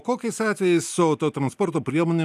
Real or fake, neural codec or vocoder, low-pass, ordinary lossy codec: real; none; 14.4 kHz; AAC, 96 kbps